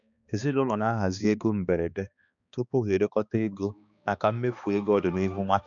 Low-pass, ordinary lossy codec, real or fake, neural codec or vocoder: 7.2 kHz; none; fake; codec, 16 kHz, 2 kbps, X-Codec, HuBERT features, trained on balanced general audio